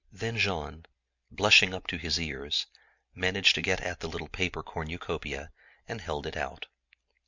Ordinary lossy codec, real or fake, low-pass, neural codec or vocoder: MP3, 64 kbps; real; 7.2 kHz; none